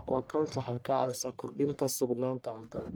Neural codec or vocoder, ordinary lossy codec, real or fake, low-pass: codec, 44.1 kHz, 1.7 kbps, Pupu-Codec; none; fake; none